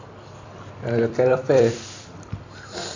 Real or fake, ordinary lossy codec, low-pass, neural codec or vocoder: fake; AAC, 48 kbps; 7.2 kHz; vocoder, 44.1 kHz, 128 mel bands every 256 samples, BigVGAN v2